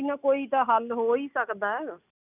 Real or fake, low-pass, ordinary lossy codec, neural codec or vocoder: real; 3.6 kHz; none; none